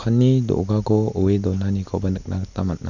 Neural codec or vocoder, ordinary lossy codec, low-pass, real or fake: none; none; 7.2 kHz; real